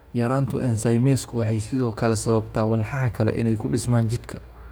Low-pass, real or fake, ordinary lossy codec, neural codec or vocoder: none; fake; none; codec, 44.1 kHz, 2.6 kbps, DAC